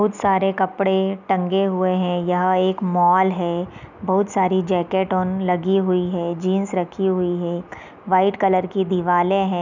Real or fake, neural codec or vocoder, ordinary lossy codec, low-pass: real; none; none; 7.2 kHz